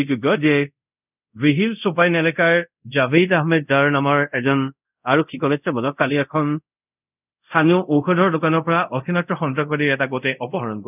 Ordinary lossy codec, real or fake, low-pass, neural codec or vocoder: none; fake; 3.6 kHz; codec, 24 kHz, 0.5 kbps, DualCodec